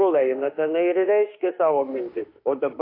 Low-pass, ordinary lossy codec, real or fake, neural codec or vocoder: 5.4 kHz; AAC, 32 kbps; fake; autoencoder, 48 kHz, 32 numbers a frame, DAC-VAE, trained on Japanese speech